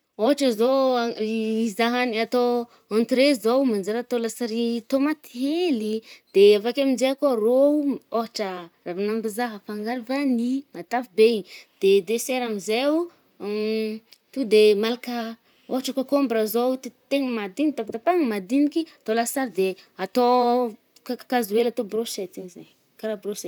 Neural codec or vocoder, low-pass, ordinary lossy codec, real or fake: vocoder, 44.1 kHz, 128 mel bands, Pupu-Vocoder; none; none; fake